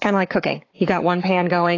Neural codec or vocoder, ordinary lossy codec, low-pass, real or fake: codec, 44.1 kHz, 7.8 kbps, Pupu-Codec; AAC, 32 kbps; 7.2 kHz; fake